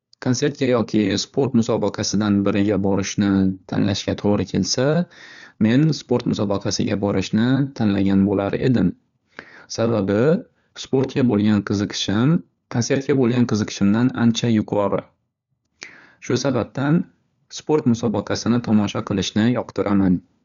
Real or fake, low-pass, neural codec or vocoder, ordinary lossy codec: fake; 7.2 kHz; codec, 16 kHz, 4 kbps, FunCodec, trained on LibriTTS, 50 frames a second; none